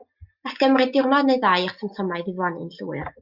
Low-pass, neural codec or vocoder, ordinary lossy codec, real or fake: 5.4 kHz; none; Opus, 64 kbps; real